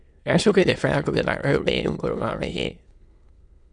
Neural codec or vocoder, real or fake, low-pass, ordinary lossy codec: autoencoder, 22.05 kHz, a latent of 192 numbers a frame, VITS, trained on many speakers; fake; 9.9 kHz; Opus, 64 kbps